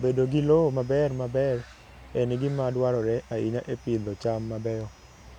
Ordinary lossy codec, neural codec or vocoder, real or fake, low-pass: none; none; real; 19.8 kHz